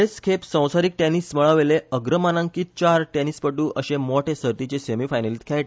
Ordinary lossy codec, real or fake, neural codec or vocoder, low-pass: none; real; none; none